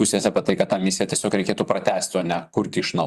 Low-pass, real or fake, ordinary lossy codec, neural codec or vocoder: 14.4 kHz; fake; Opus, 64 kbps; vocoder, 44.1 kHz, 128 mel bands, Pupu-Vocoder